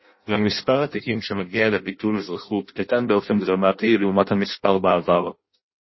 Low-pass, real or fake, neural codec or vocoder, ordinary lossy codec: 7.2 kHz; fake; codec, 16 kHz in and 24 kHz out, 0.6 kbps, FireRedTTS-2 codec; MP3, 24 kbps